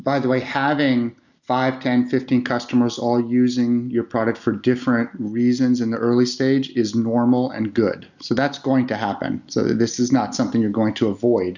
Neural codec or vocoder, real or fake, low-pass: none; real; 7.2 kHz